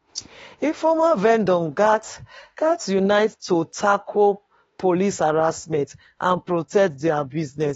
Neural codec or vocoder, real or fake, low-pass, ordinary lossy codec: autoencoder, 48 kHz, 32 numbers a frame, DAC-VAE, trained on Japanese speech; fake; 19.8 kHz; AAC, 24 kbps